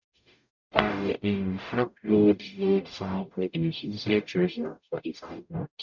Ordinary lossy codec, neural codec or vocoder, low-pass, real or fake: none; codec, 44.1 kHz, 0.9 kbps, DAC; 7.2 kHz; fake